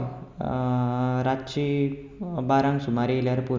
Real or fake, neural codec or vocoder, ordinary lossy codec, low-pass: real; none; none; none